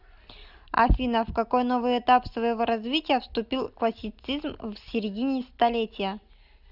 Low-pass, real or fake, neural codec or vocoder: 5.4 kHz; real; none